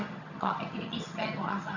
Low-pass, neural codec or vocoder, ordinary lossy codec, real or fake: 7.2 kHz; vocoder, 22.05 kHz, 80 mel bands, HiFi-GAN; none; fake